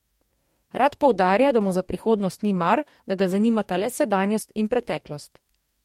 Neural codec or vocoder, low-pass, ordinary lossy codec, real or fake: codec, 44.1 kHz, 2.6 kbps, DAC; 19.8 kHz; MP3, 64 kbps; fake